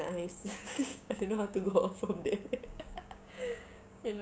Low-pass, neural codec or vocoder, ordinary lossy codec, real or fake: none; codec, 16 kHz, 6 kbps, DAC; none; fake